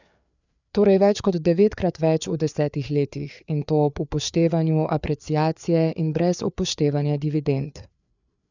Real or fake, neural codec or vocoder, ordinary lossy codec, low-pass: fake; codec, 16 kHz, 4 kbps, FreqCodec, larger model; none; 7.2 kHz